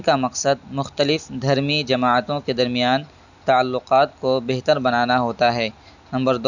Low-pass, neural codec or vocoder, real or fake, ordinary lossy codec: 7.2 kHz; none; real; none